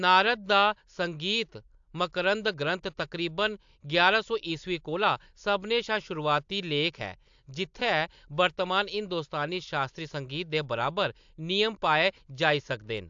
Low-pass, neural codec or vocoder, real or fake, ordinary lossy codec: 7.2 kHz; none; real; AAC, 64 kbps